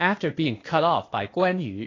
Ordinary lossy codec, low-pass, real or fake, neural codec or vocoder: AAC, 32 kbps; 7.2 kHz; fake; codec, 16 kHz, 0.8 kbps, ZipCodec